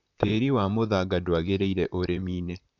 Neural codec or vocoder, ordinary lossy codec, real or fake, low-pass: vocoder, 44.1 kHz, 128 mel bands, Pupu-Vocoder; none; fake; 7.2 kHz